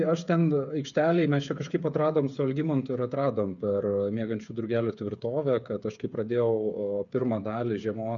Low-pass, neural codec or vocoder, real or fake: 7.2 kHz; codec, 16 kHz, 8 kbps, FreqCodec, smaller model; fake